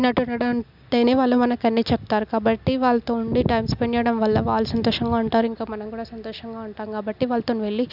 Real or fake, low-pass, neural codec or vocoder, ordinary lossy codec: real; 5.4 kHz; none; none